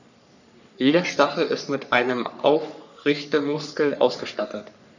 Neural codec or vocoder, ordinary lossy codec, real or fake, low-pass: codec, 44.1 kHz, 3.4 kbps, Pupu-Codec; none; fake; 7.2 kHz